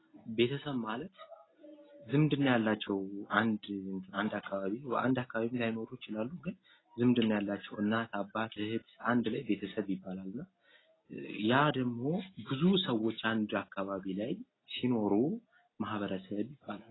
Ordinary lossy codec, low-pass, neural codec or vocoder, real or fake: AAC, 16 kbps; 7.2 kHz; none; real